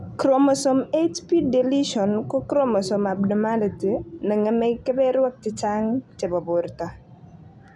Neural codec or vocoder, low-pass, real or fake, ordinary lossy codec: none; none; real; none